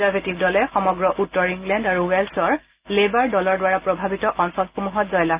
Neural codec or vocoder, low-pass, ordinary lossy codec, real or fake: none; 3.6 kHz; Opus, 32 kbps; real